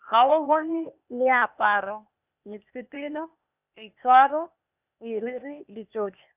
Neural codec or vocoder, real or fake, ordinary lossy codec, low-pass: codec, 16 kHz, 0.8 kbps, ZipCodec; fake; none; 3.6 kHz